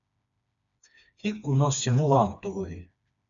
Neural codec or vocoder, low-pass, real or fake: codec, 16 kHz, 2 kbps, FreqCodec, smaller model; 7.2 kHz; fake